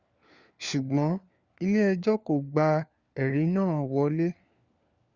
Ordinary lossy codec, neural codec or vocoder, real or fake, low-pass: Opus, 64 kbps; codec, 16 kHz, 4 kbps, FunCodec, trained on LibriTTS, 50 frames a second; fake; 7.2 kHz